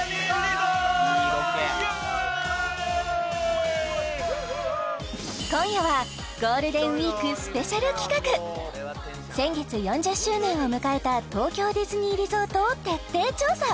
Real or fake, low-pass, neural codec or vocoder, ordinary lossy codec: real; none; none; none